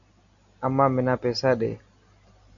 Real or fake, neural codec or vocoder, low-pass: real; none; 7.2 kHz